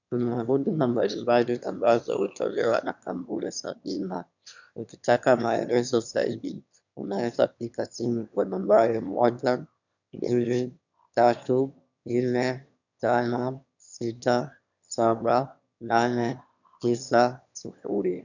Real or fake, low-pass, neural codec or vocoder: fake; 7.2 kHz; autoencoder, 22.05 kHz, a latent of 192 numbers a frame, VITS, trained on one speaker